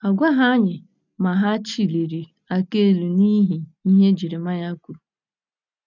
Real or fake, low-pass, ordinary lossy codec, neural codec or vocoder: real; 7.2 kHz; none; none